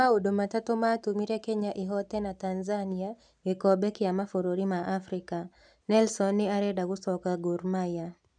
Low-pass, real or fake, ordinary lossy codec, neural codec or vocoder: 9.9 kHz; real; none; none